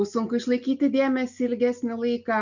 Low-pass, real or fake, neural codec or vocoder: 7.2 kHz; real; none